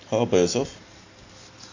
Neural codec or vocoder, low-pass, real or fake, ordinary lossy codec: none; 7.2 kHz; real; AAC, 48 kbps